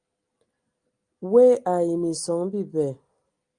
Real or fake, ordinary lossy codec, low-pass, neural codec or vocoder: real; Opus, 32 kbps; 9.9 kHz; none